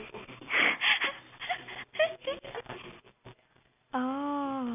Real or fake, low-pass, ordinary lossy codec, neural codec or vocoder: real; 3.6 kHz; none; none